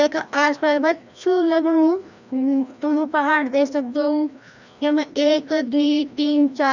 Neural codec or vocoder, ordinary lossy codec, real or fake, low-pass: codec, 16 kHz, 1 kbps, FreqCodec, larger model; none; fake; 7.2 kHz